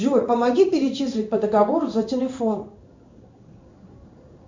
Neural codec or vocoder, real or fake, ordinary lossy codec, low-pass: codec, 16 kHz in and 24 kHz out, 1 kbps, XY-Tokenizer; fake; MP3, 48 kbps; 7.2 kHz